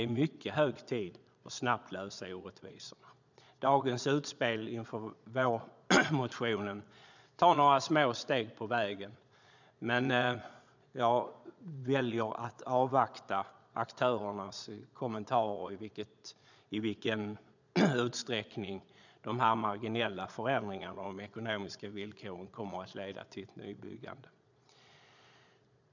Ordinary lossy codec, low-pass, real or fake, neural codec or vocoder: none; 7.2 kHz; fake; vocoder, 22.05 kHz, 80 mel bands, Vocos